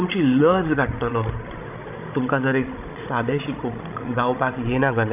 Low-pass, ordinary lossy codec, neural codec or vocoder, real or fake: 3.6 kHz; none; codec, 16 kHz, 16 kbps, FreqCodec, larger model; fake